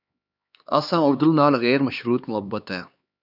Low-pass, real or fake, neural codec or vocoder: 5.4 kHz; fake; codec, 16 kHz, 2 kbps, X-Codec, HuBERT features, trained on LibriSpeech